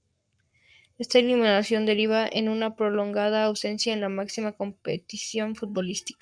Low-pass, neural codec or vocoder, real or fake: 9.9 kHz; codec, 44.1 kHz, 7.8 kbps, Pupu-Codec; fake